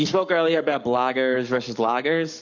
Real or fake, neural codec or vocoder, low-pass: fake; codec, 44.1 kHz, 7.8 kbps, Pupu-Codec; 7.2 kHz